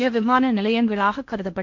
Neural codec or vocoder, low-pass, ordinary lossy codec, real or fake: codec, 16 kHz in and 24 kHz out, 0.8 kbps, FocalCodec, streaming, 65536 codes; 7.2 kHz; MP3, 48 kbps; fake